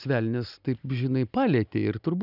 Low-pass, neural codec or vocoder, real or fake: 5.4 kHz; none; real